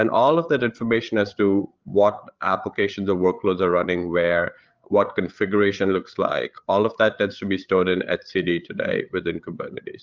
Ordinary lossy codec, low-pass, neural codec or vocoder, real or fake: Opus, 24 kbps; 7.2 kHz; codec, 16 kHz, 8 kbps, FreqCodec, larger model; fake